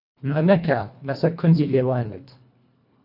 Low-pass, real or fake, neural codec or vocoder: 5.4 kHz; fake; codec, 24 kHz, 1.5 kbps, HILCodec